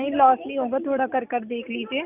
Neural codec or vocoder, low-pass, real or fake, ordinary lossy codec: none; 3.6 kHz; real; none